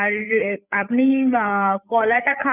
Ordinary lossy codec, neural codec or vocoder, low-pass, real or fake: none; codec, 16 kHz, 8 kbps, FreqCodec, larger model; 3.6 kHz; fake